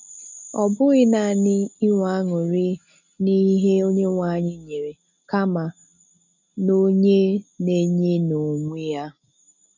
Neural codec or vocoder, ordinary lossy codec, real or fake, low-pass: none; none; real; 7.2 kHz